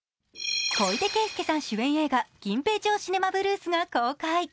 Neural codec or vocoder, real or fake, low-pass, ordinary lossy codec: none; real; none; none